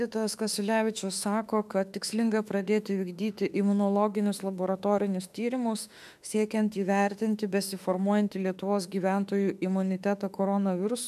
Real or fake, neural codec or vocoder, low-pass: fake; autoencoder, 48 kHz, 32 numbers a frame, DAC-VAE, trained on Japanese speech; 14.4 kHz